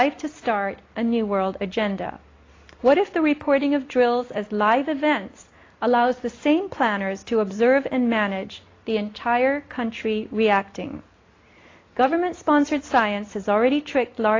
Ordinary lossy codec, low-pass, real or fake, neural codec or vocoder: AAC, 32 kbps; 7.2 kHz; real; none